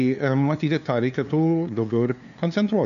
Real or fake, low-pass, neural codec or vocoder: fake; 7.2 kHz; codec, 16 kHz, 2 kbps, FunCodec, trained on LibriTTS, 25 frames a second